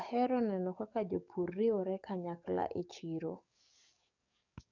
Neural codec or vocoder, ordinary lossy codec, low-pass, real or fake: codec, 44.1 kHz, 7.8 kbps, DAC; none; 7.2 kHz; fake